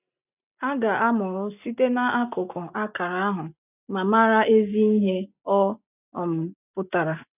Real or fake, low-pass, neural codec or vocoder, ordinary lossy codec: real; 3.6 kHz; none; none